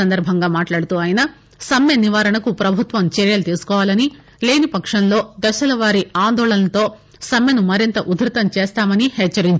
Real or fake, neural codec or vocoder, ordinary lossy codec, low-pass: real; none; none; none